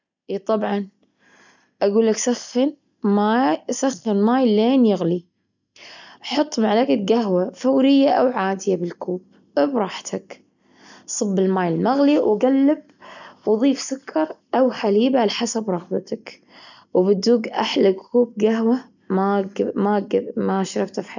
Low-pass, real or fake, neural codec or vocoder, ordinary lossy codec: 7.2 kHz; real; none; none